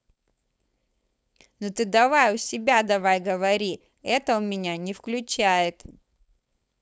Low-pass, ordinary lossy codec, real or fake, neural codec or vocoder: none; none; fake; codec, 16 kHz, 4.8 kbps, FACodec